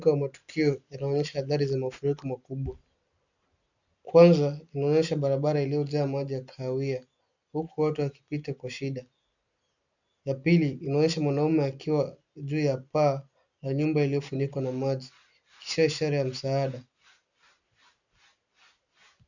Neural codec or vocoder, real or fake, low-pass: none; real; 7.2 kHz